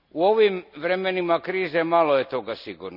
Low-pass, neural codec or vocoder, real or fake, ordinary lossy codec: 5.4 kHz; none; real; none